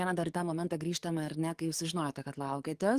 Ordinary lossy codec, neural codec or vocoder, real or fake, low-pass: Opus, 24 kbps; codec, 44.1 kHz, 7.8 kbps, DAC; fake; 14.4 kHz